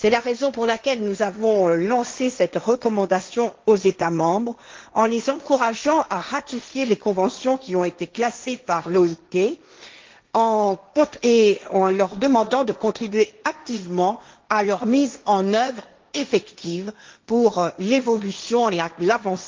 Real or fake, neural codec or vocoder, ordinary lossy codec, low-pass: fake; codec, 16 kHz, 1.1 kbps, Voila-Tokenizer; Opus, 24 kbps; 7.2 kHz